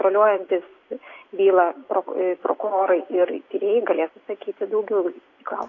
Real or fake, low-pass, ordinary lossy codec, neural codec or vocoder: real; 7.2 kHz; AAC, 32 kbps; none